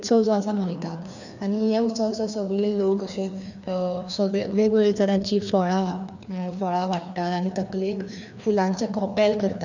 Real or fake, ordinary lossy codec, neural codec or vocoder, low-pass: fake; none; codec, 16 kHz, 2 kbps, FreqCodec, larger model; 7.2 kHz